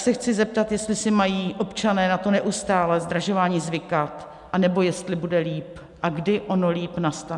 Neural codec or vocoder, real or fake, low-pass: none; real; 10.8 kHz